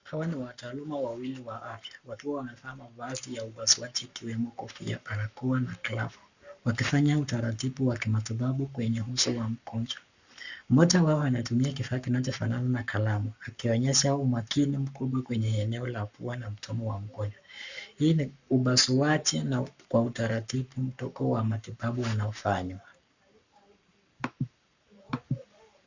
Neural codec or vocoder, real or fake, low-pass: codec, 44.1 kHz, 7.8 kbps, Pupu-Codec; fake; 7.2 kHz